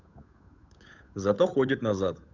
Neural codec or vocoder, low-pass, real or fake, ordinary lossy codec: codec, 16 kHz, 8 kbps, FunCodec, trained on Chinese and English, 25 frames a second; 7.2 kHz; fake; none